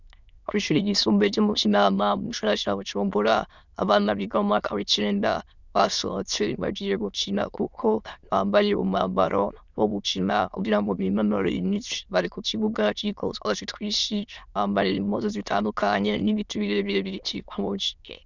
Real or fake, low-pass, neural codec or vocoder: fake; 7.2 kHz; autoencoder, 22.05 kHz, a latent of 192 numbers a frame, VITS, trained on many speakers